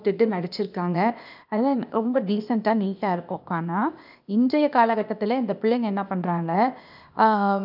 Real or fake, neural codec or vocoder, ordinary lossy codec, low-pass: fake; codec, 16 kHz, 0.8 kbps, ZipCodec; none; 5.4 kHz